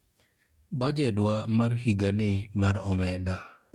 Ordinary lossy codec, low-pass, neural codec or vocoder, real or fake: MP3, 96 kbps; 19.8 kHz; codec, 44.1 kHz, 2.6 kbps, DAC; fake